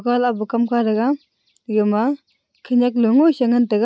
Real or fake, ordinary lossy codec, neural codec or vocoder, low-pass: real; none; none; none